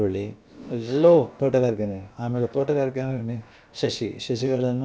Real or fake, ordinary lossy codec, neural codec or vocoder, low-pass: fake; none; codec, 16 kHz, about 1 kbps, DyCAST, with the encoder's durations; none